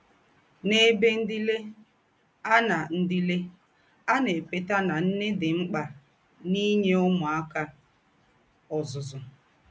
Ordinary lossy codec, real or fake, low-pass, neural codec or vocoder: none; real; none; none